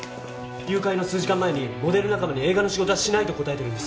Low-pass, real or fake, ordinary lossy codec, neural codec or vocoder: none; real; none; none